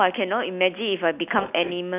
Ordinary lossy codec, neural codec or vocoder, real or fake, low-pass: none; none; real; 3.6 kHz